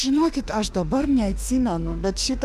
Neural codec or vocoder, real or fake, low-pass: autoencoder, 48 kHz, 32 numbers a frame, DAC-VAE, trained on Japanese speech; fake; 14.4 kHz